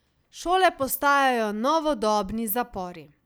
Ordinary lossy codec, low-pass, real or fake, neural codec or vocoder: none; none; real; none